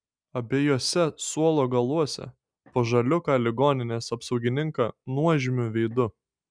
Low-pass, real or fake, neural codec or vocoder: 9.9 kHz; real; none